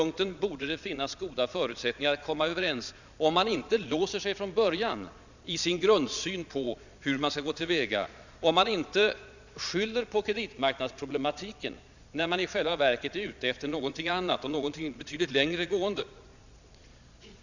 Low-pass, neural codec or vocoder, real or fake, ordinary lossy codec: 7.2 kHz; vocoder, 22.05 kHz, 80 mel bands, Vocos; fake; none